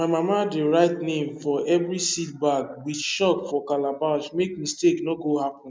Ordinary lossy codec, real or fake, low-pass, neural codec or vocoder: none; real; none; none